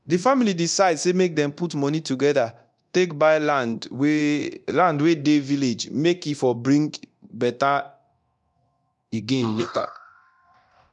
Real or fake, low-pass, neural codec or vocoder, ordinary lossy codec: fake; 10.8 kHz; codec, 24 kHz, 0.9 kbps, DualCodec; none